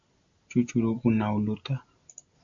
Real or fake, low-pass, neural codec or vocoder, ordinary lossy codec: real; 7.2 kHz; none; AAC, 64 kbps